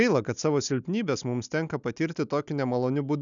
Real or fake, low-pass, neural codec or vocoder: real; 7.2 kHz; none